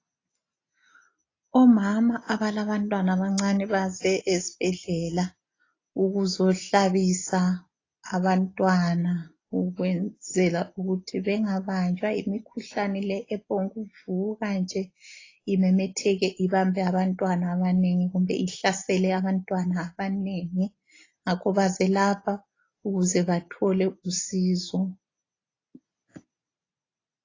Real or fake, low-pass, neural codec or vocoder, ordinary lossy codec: real; 7.2 kHz; none; AAC, 32 kbps